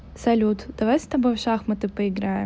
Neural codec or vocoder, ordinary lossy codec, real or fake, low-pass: none; none; real; none